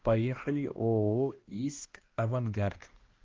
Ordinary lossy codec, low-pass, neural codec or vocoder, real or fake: Opus, 16 kbps; 7.2 kHz; codec, 16 kHz, 1 kbps, X-Codec, HuBERT features, trained on balanced general audio; fake